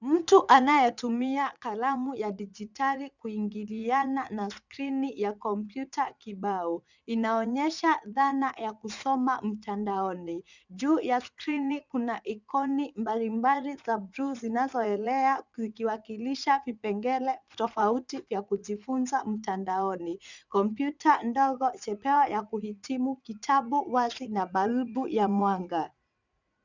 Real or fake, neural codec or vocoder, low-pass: fake; vocoder, 44.1 kHz, 80 mel bands, Vocos; 7.2 kHz